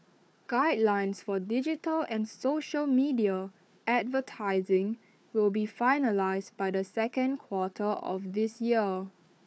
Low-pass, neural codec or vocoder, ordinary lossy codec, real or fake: none; codec, 16 kHz, 4 kbps, FunCodec, trained on Chinese and English, 50 frames a second; none; fake